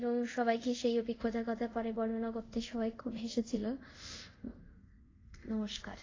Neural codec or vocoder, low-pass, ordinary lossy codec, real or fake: codec, 24 kHz, 0.5 kbps, DualCodec; 7.2 kHz; AAC, 32 kbps; fake